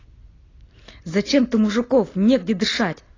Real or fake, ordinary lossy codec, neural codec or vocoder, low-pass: real; AAC, 32 kbps; none; 7.2 kHz